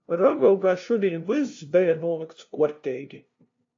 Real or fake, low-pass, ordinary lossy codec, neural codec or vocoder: fake; 7.2 kHz; AAC, 48 kbps; codec, 16 kHz, 0.5 kbps, FunCodec, trained on LibriTTS, 25 frames a second